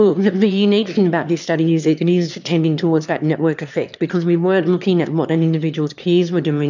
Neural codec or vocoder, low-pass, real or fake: autoencoder, 22.05 kHz, a latent of 192 numbers a frame, VITS, trained on one speaker; 7.2 kHz; fake